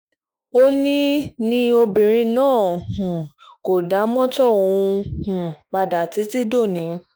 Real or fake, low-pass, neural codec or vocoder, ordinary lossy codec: fake; none; autoencoder, 48 kHz, 32 numbers a frame, DAC-VAE, trained on Japanese speech; none